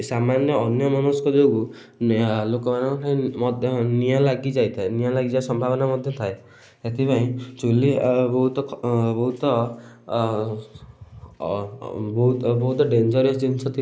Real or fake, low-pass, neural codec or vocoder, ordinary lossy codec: real; none; none; none